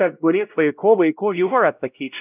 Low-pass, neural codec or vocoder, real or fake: 3.6 kHz; codec, 16 kHz, 0.5 kbps, X-Codec, WavLM features, trained on Multilingual LibriSpeech; fake